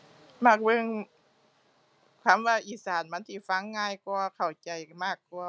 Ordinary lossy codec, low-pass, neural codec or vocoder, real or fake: none; none; none; real